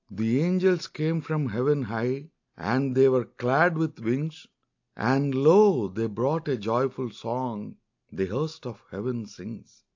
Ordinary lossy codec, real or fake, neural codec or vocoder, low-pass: AAC, 48 kbps; real; none; 7.2 kHz